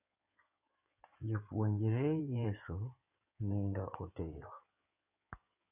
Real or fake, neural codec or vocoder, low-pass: fake; codec, 16 kHz in and 24 kHz out, 2.2 kbps, FireRedTTS-2 codec; 3.6 kHz